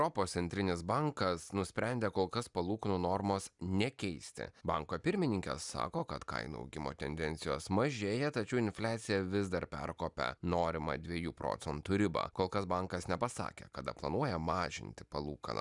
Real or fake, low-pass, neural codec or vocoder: real; 10.8 kHz; none